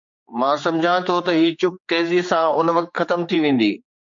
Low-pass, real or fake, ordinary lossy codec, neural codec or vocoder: 7.2 kHz; fake; MP3, 48 kbps; codec, 16 kHz, 4 kbps, X-Codec, HuBERT features, trained on general audio